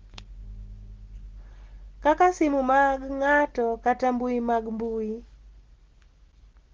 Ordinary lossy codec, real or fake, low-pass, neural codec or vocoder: Opus, 16 kbps; real; 7.2 kHz; none